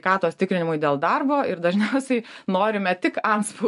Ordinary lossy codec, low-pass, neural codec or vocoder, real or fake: AAC, 96 kbps; 10.8 kHz; none; real